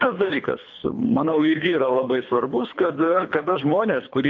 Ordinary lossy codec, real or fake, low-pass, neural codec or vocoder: AAC, 48 kbps; fake; 7.2 kHz; codec, 24 kHz, 3 kbps, HILCodec